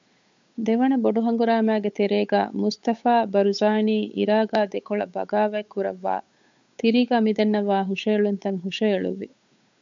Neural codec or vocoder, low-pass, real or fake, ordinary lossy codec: codec, 16 kHz, 8 kbps, FunCodec, trained on Chinese and English, 25 frames a second; 7.2 kHz; fake; MP3, 64 kbps